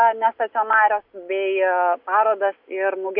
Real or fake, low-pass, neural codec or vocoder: real; 5.4 kHz; none